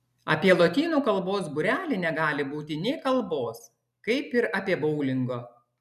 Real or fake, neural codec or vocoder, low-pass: real; none; 14.4 kHz